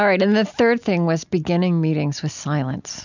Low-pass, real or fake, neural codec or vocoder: 7.2 kHz; real; none